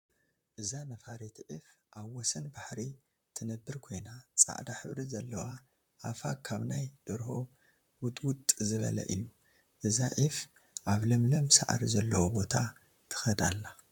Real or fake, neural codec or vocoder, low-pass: fake; vocoder, 44.1 kHz, 128 mel bands, Pupu-Vocoder; 19.8 kHz